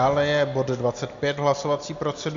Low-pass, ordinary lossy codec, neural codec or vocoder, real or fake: 7.2 kHz; Opus, 64 kbps; none; real